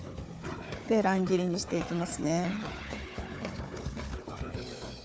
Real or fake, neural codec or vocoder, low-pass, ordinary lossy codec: fake; codec, 16 kHz, 4 kbps, FunCodec, trained on Chinese and English, 50 frames a second; none; none